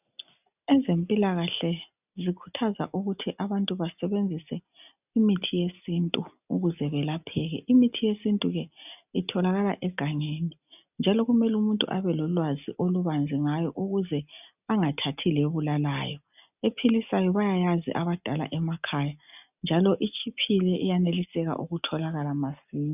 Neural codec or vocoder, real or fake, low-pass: none; real; 3.6 kHz